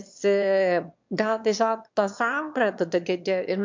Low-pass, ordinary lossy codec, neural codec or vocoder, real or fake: 7.2 kHz; MP3, 64 kbps; autoencoder, 22.05 kHz, a latent of 192 numbers a frame, VITS, trained on one speaker; fake